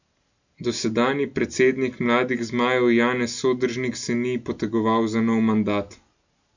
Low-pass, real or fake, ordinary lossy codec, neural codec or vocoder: 7.2 kHz; real; none; none